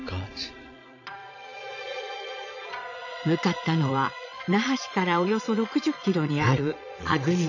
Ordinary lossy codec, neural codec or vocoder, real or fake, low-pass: none; vocoder, 22.05 kHz, 80 mel bands, Vocos; fake; 7.2 kHz